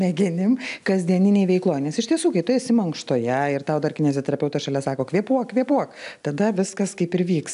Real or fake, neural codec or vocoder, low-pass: real; none; 10.8 kHz